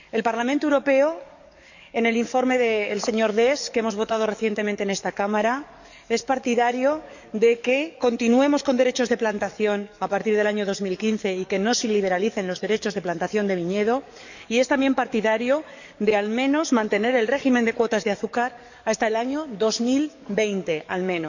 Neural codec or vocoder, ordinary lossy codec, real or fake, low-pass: codec, 44.1 kHz, 7.8 kbps, DAC; none; fake; 7.2 kHz